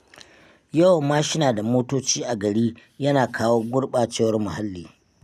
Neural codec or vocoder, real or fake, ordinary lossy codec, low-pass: none; real; none; 14.4 kHz